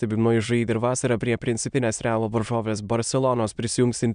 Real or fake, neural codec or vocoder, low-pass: fake; autoencoder, 22.05 kHz, a latent of 192 numbers a frame, VITS, trained on many speakers; 9.9 kHz